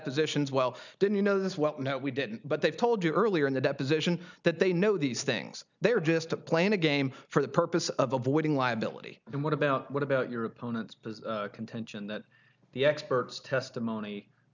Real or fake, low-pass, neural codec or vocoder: real; 7.2 kHz; none